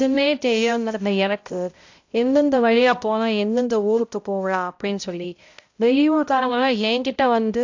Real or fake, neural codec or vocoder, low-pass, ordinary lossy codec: fake; codec, 16 kHz, 0.5 kbps, X-Codec, HuBERT features, trained on balanced general audio; 7.2 kHz; AAC, 48 kbps